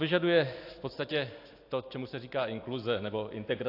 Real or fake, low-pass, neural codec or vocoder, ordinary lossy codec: real; 5.4 kHz; none; AAC, 32 kbps